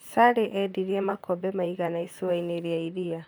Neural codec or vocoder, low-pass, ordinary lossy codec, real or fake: vocoder, 44.1 kHz, 128 mel bands, Pupu-Vocoder; none; none; fake